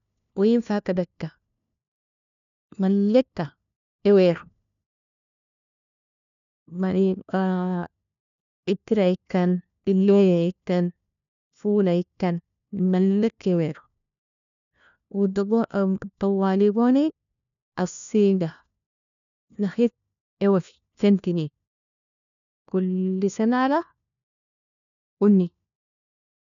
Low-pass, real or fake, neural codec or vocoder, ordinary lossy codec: 7.2 kHz; fake; codec, 16 kHz, 1 kbps, FunCodec, trained on LibriTTS, 50 frames a second; none